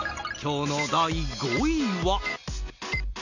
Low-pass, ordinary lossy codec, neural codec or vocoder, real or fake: 7.2 kHz; none; none; real